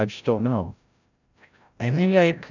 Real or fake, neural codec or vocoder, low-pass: fake; codec, 16 kHz, 0.5 kbps, FreqCodec, larger model; 7.2 kHz